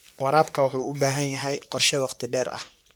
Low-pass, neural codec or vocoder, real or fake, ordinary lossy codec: none; codec, 44.1 kHz, 3.4 kbps, Pupu-Codec; fake; none